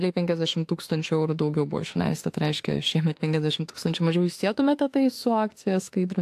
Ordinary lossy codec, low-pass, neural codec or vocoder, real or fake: AAC, 64 kbps; 14.4 kHz; autoencoder, 48 kHz, 32 numbers a frame, DAC-VAE, trained on Japanese speech; fake